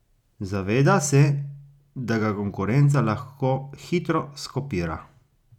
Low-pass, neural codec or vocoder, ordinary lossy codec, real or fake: 19.8 kHz; none; none; real